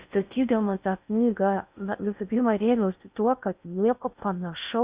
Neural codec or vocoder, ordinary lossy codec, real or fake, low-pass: codec, 16 kHz in and 24 kHz out, 0.6 kbps, FocalCodec, streaming, 4096 codes; Opus, 64 kbps; fake; 3.6 kHz